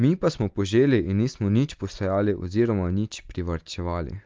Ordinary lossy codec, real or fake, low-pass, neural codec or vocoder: Opus, 32 kbps; real; 7.2 kHz; none